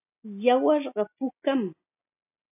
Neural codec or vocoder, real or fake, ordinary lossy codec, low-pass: none; real; AAC, 24 kbps; 3.6 kHz